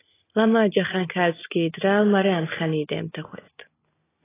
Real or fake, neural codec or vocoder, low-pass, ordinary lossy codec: real; none; 3.6 kHz; AAC, 16 kbps